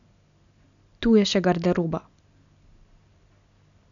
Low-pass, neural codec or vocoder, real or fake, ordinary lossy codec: 7.2 kHz; none; real; none